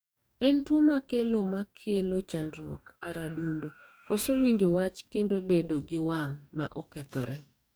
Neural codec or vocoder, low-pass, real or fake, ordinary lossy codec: codec, 44.1 kHz, 2.6 kbps, DAC; none; fake; none